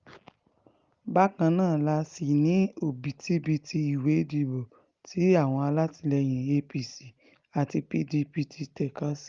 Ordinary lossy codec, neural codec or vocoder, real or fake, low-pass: Opus, 32 kbps; none; real; 7.2 kHz